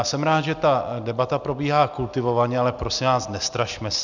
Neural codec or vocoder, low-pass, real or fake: none; 7.2 kHz; real